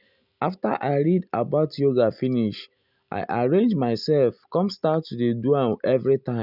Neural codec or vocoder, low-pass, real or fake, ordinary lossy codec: none; 5.4 kHz; real; none